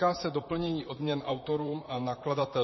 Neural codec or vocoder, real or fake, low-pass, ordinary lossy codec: vocoder, 44.1 kHz, 128 mel bands, Pupu-Vocoder; fake; 7.2 kHz; MP3, 24 kbps